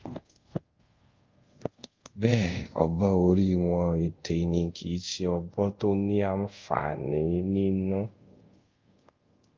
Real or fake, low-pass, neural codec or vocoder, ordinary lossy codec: fake; 7.2 kHz; codec, 24 kHz, 0.5 kbps, DualCodec; Opus, 32 kbps